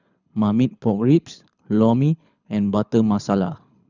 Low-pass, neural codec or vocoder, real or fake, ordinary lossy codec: 7.2 kHz; codec, 24 kHz, 6 kbps, HILCodec; fake; none